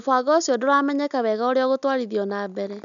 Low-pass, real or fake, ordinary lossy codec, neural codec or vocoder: 7.2 kHz; real; none; none